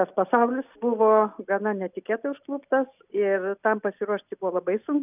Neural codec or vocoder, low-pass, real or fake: none; 3.6 kHz; real